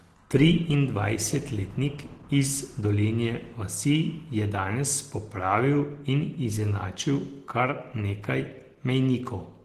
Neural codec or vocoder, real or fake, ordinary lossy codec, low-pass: none; real; Opus, 16 kbps; 14.4 kHz